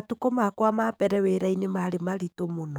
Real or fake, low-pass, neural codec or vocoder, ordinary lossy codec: fake; none; codec, 44.1 kHz, 7.8 kbps, DAC; none